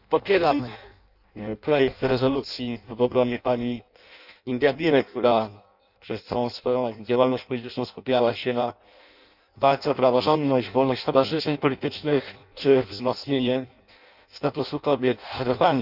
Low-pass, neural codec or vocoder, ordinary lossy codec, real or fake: 5.4 kHz; codec, 16 kHz in and 24 kHz out, 0.6 kbps, FireRedTTS-2 codec; none; fake